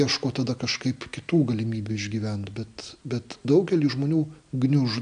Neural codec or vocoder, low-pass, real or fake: none; 9.9 kHz; real